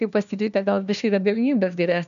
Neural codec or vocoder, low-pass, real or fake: codec, 16 kHz, 1 kbps, FunCodec, trained on LibriTTS, 50 frames a second; 7.2 kHz; fake